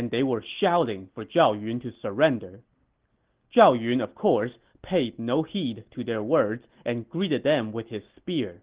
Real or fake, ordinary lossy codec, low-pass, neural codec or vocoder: real; Opus, 16 kbps; 3.6 kHz; none